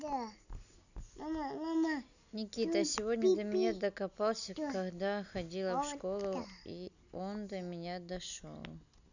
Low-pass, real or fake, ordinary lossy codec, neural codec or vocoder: 7.2 kHz; real; none; none